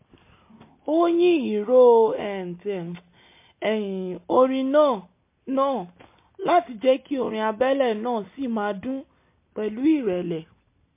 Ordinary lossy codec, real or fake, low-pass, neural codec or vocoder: MP3, 24 kbps; real; 3.6 kHz; none